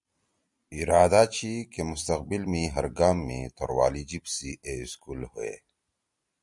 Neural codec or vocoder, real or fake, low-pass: none; real; 10.8 kHz